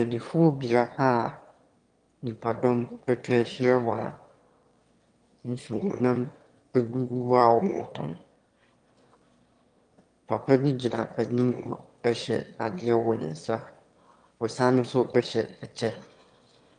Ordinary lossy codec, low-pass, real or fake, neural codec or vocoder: Opus, 32 kbps; 9.9 kHz; fake; autoencoder, 22.05 kHz, a latent of 192 numbers a frame, VITS, trained on one speaker